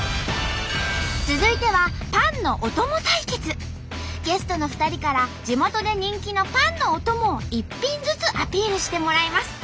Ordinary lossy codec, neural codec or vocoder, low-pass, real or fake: none; none; none; real